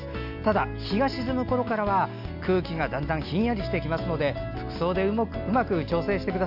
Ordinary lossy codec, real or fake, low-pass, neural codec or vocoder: AAC, 48 kbps; real; 5.4 kHz; none